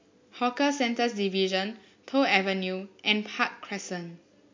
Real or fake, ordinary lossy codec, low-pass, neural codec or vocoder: real; MP3, 48 kbps; 7.2 kHz; none